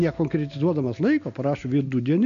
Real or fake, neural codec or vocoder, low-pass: real; none; 7.2 kHz